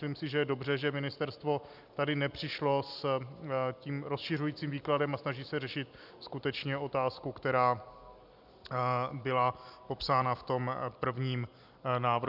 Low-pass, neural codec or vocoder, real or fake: 5.4 kHz; none; real